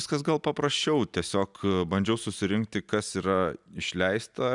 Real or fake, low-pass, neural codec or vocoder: real; 10.8 kHz; none